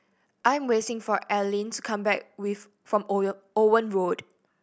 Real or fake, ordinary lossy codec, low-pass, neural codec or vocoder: real; none; none; none